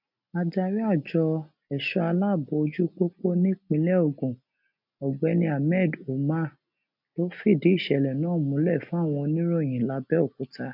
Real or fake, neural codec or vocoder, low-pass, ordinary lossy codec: real; none; 5.4 kHz; none